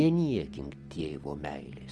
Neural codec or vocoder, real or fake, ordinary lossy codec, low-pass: none; real; Opus, 24 kbps; 10.8 kHz